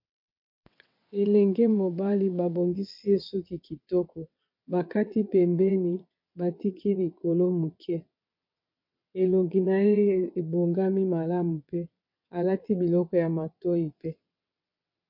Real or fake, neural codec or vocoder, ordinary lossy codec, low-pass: fake; vocoder, 22.05 kHz, 80 mel bands, WaveNeXt; MP3, 32 kbps; 5.4 kHz